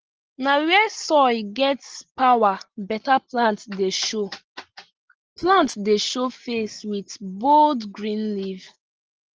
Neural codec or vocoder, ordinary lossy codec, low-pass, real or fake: none; Opus, 16 kbps; 7.2 kHz; real